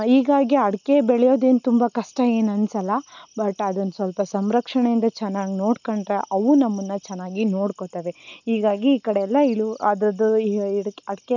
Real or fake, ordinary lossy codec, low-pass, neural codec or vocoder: real; none; 7.2 kHz; none